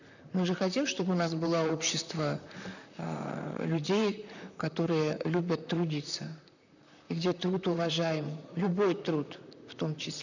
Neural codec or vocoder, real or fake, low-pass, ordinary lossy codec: vocoder, 44.1 kHz, 128 mel bands, Pupu-Vocoder; fake; 7.2 kHz; none